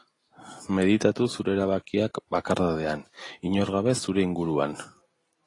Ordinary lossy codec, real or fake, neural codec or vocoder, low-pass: AAC, 32 kbps; real; none; 10.8 kHz